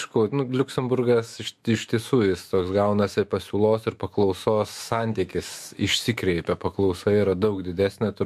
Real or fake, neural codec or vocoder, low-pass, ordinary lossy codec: fake; autoencoder, 48 kHz, 128 numbers a frame, DAC-VAE, trained on Japanese speech; 14.4 kHz; MP3, 64 kbps